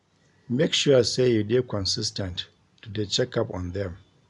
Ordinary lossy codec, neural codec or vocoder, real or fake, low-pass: none; none; real; 10.8 kHz